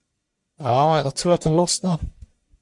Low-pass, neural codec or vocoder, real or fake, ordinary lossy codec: 10.8 kHz; codec, 44.1 kHz, 1.7 kbps, Pupu-Codec; fake; MP3, 64 kbps